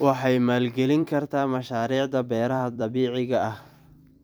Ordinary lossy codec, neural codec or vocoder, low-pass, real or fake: none; vocoder, 44.1 kHz, 128 mel bands every 256 samples, BigVGAN v2; none; fake